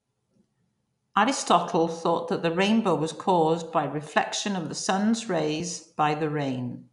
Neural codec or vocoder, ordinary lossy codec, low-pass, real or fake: none; none; 10.8 kHz; real